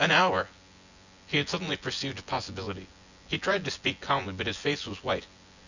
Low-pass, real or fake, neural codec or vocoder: 7.2 kHz; fake; vocoder, 24 kHz, 100 mel bands, Vocos